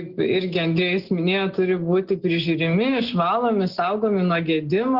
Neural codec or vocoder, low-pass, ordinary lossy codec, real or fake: none; 5.4 kHz; Opus, 16 kbps; real